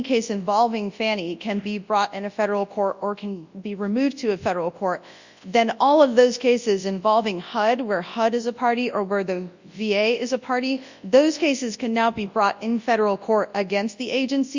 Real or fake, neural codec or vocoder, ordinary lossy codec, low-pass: fake; codec, 24 kHz, 0.9 kbps, WavTokenizer, large speech release; Opus, 64 kbps; 7.2 kHz